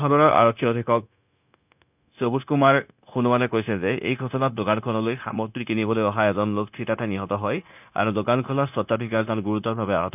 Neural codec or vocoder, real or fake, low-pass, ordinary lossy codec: codec, 16 kHz, 0.9 kbps, LongCat-Audio-Codec; fake; 3.6 kHz; none